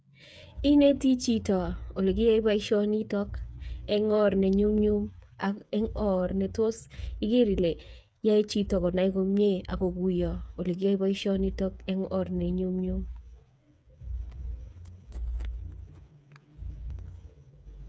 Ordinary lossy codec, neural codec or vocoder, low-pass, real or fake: none; codec, 16 kHz, 8 kbps, FreqCodec, smaller model; none; fake